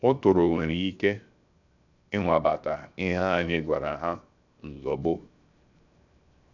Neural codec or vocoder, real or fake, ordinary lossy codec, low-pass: codec, 16 kHz, 0.7 kbps, FocalCodec; fake; none; 7.2 kHz